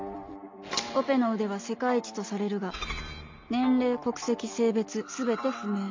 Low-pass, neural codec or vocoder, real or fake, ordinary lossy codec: 7.2 kHz; none; real; MP3, 48 kbps